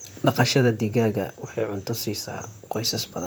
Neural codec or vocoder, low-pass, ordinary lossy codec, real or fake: vocoder, 44.1 kHz, 128 mel bands, Pupu-Vocoder; none; none; fake